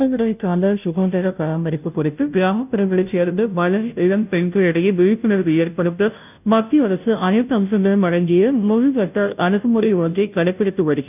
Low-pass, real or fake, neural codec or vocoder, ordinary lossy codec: 3.6 kHz; fake; codec, 16 kHz, 0.5 kbps, FunCodec, trained on Chinese and English, 25 frames a second; none